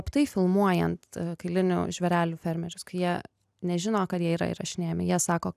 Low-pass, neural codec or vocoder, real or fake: 14.4 kHz; none; real